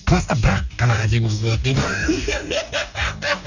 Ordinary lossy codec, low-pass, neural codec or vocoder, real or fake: none; 7.2 kHz; codec, 44.1 kHz, 2.6 kbps, DAC; fake